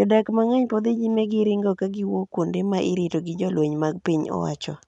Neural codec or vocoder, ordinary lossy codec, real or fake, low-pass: none; none; real; 10.8 kHz